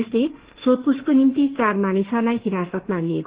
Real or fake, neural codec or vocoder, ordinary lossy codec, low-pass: fake; autoencoder, 48 kHz, 32 numbers a frame, DAC-VAE, trained on Japanese speech; Opus, 16 kbps; 3.6 kHz